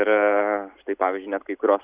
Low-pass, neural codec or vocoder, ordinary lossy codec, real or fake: 3.6 kHz; none; Opus, 64 kbps; real